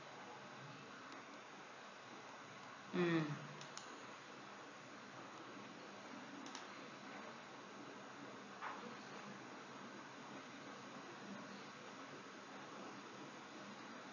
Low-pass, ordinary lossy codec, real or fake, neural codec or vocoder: 7.2 kHz; none; real; none